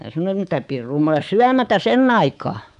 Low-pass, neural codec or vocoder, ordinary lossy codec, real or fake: 10.8 kHz; codec, 24 kHz, 3.1 kbps, DualCodec; none; fake